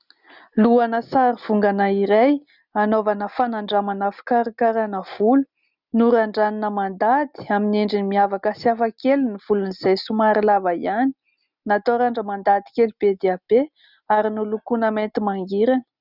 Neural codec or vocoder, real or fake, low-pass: none; real; 5.4 kHz